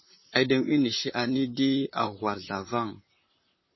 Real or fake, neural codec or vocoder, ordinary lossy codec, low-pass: fake; vocoder, 44.1 kHz, 128 mel bands, Pupu-Vocoder; MP3, 24 kbps; 7.2 kHz